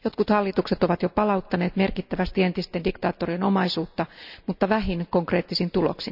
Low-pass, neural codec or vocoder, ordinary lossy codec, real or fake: 5.4 kHz; none; none; real